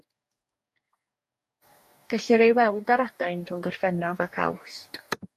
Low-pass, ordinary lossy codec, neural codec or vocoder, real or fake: 14.4 kHz; MP3, 64 kbps; codec, 44.1 kHz, 2.6 kbps, DAC; fake